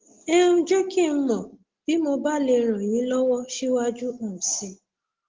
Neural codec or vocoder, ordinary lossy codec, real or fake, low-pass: none; Opus, 16 kbps; real; 7.2 kHz